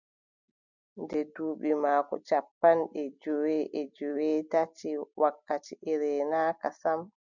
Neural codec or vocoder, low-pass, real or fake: none; 7.2 kHz; real